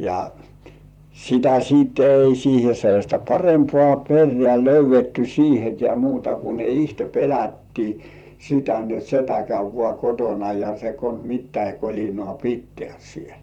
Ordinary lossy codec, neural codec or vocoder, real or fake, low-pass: none; vocoder, 44.1 kHz, 128 mel bands, Pupu-Vocoder; fake; 19.8 kHz